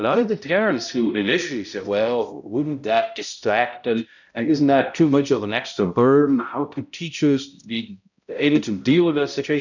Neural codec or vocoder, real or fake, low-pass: codec, 16 kHz, 0.5 kbps, X-Codec, HuBERT features, trained on balanced general audio; fake; 7.2 kHz